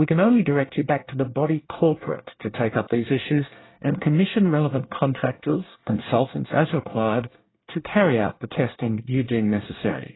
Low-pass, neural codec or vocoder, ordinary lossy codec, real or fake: 7.2 kHz; codec, 24 kHz, 1 kbps, SNAC; AAC, 16 kbps; fake